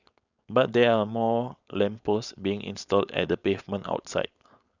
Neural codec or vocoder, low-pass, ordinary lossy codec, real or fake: codec, 16 kHz, 4.8 kbps, FACodec; 7.2 kHz; none; fake